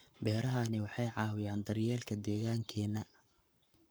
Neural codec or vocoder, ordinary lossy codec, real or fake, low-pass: codec, 44.1 kHz, 7.8 kbps, Pupu-Codec; none; fake; none